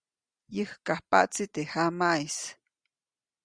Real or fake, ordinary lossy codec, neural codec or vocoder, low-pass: real; Opus, 64 kbps; none; 9.9 kHz